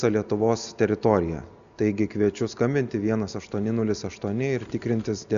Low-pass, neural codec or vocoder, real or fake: 7.2 kHz; none; real